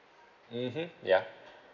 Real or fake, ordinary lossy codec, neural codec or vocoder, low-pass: real; none; none; 7.2 kHz